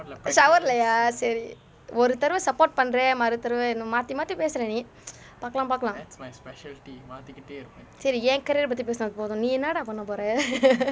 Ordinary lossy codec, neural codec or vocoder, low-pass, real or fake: none; none; none; real